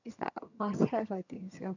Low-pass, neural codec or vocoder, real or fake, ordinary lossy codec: 7.2 kHz; vocoder, 22.05 kHz, 80 mel bands, HiFi-GAN; fake; none